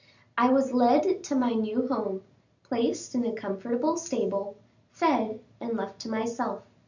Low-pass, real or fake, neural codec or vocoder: 7.2 kHz; real; none